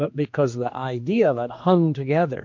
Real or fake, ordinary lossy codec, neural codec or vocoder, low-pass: fake; MP3, 48 kbps; codec, 16 kHz, 2 kbps, X-Codec, HuBERT features, trained on general audio; 7.2 kHz